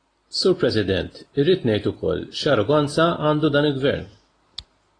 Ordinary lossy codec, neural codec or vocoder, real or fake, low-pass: AAC, 32 kbps; none; real; 9.9 kHz